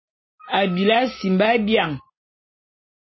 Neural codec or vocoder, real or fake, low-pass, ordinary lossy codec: none; real; 7.2 kHz; MP3, 24 kbps